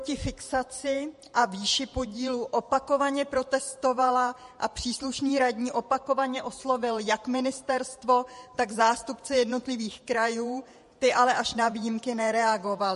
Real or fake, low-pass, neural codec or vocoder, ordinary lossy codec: fake; 14.4 kHz; vocoder, 48 kHz, 128 mel bands, Vocos; MP3, 48 kbps